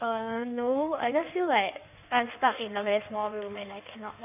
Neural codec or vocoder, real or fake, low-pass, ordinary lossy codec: codec, 16 kHz in and 24 kHz out, 1.1 kbps, FireRedTTS-2 codec; fake; 3.6 kHz; none